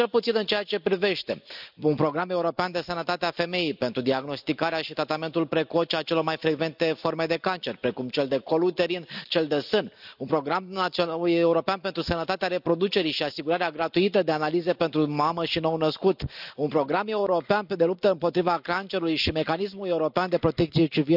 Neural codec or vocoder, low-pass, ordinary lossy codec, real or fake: none; 5.4 kHz; none; real